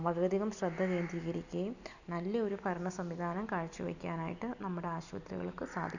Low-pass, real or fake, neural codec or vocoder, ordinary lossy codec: 7.2 kHz; real; none; none